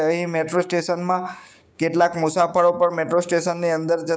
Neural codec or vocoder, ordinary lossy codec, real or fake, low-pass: codec, 16 kHz, 6 kbps, DAC; none; fake; none